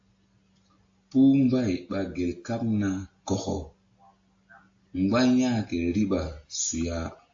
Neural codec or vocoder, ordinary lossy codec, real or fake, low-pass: none; AAC, 48 kbps; real; 7.2 kHz